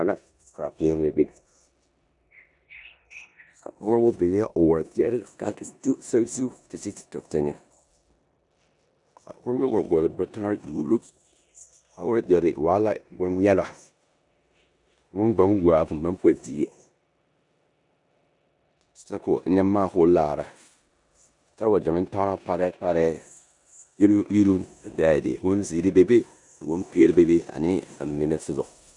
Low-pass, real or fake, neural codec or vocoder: 10.8 kHz; fake; codec, 16 kHz in and 24 kHz out, 0.9 kbps, LongCat-Audio-Codec, four codebook decoder